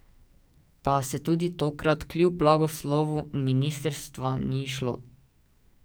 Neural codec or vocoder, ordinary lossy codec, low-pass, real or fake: codec, 44.1 kHz, 2.6 kbps, SNAC; none; none; fake